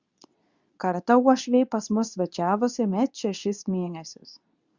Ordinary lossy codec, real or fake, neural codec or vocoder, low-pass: Opus, 64 kbps; fake; codec, 24 kHz, 0.9 kbps, WavTokenizer, medium speech release version 2; 7.2 kHz